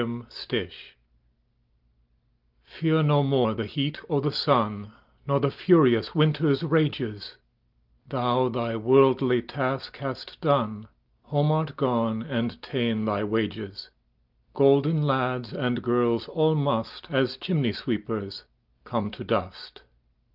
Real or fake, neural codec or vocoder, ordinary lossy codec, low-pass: real; none; Opus, 32 kbps; 5.4 kHz